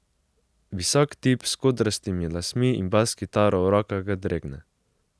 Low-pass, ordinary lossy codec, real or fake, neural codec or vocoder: none; none; real; none